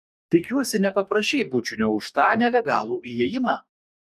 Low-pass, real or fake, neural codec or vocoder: 14.4 kHz; fake; codec, 44.1 kHz, 2.6 kbps, DAC